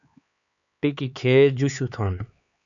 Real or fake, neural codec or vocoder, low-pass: fake; codec, 16 kHz, 4 kbps, X-Codec, HuBERT features, trained on LibriSpeech; 7.2 kHz